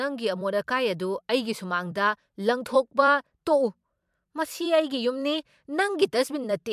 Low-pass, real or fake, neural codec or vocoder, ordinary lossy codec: 14.4 kHz; fake; vocoder, 44.1 kHz, 128 mel bands every 256 samples, BigVGAN v2; MP3, 96 kbps